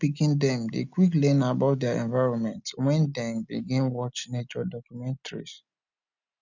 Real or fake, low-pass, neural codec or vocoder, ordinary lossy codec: real; 7.2 kHz; none; none